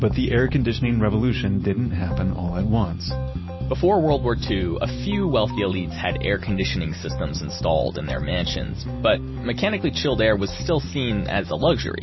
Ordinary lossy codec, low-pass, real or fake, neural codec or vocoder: MP3, 24 kbps; 7.2 kHz; real; none